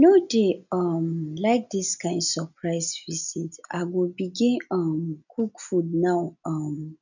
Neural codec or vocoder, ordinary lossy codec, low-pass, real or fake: none; none; 7.2 kHz; real